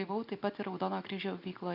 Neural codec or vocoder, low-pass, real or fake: none; 5.4 kHz; real